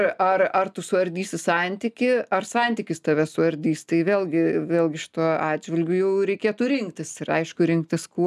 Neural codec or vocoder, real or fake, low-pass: none; real; 14.4 kHz